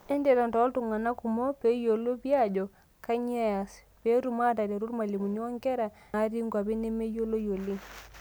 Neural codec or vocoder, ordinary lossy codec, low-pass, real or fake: none; none; none; real